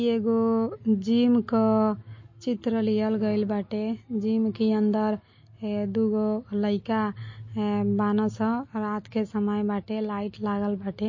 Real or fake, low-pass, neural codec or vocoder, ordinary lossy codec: real; 7.2 kHz; none; MP3, 32 kbps